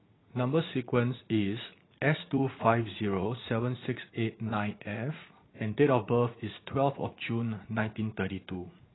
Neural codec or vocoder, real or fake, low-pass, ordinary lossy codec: vocoder, 44.1 kHz, 80 mel bands, Vocos; fake; 7.2 kHz; AAC, 16 kbps